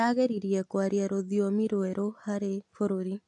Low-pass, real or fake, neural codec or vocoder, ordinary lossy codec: 10.8 kHz; real; none; AAC, 64 kbps